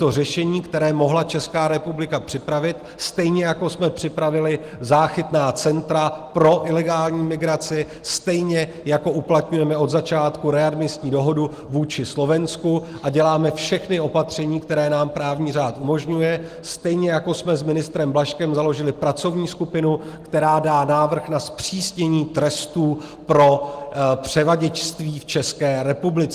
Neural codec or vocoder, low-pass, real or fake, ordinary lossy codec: none; 14.4 kHz; real; Opus, 24 kbps